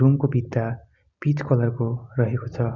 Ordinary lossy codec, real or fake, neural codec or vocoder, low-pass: Opus, 64 kbps; real; none; 7.2 kHz